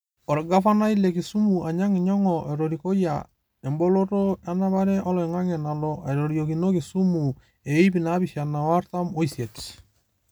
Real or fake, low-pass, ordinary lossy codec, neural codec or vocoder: real; none; none; none